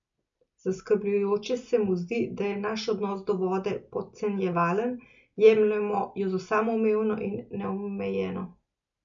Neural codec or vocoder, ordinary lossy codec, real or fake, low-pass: none; MP3, 64 kbps; real; 7.2 kHz